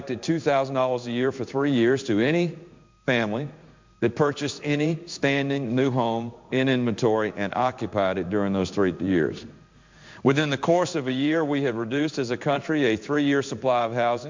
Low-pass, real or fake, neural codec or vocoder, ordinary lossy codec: 7.2 kHz; fake; codec, 16 kHz in and 24 kHz out, 1 kbps, XY-Tokenizer; MP3, 64 kbps